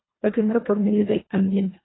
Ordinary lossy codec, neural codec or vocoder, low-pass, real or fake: AAC, 16 kbps; codec, 24 kHz, 1.5 kbps, HILCodec; 7.2 kHz; fake